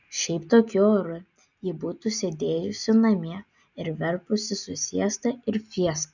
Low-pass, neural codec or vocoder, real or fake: 7.2 kHz; none; real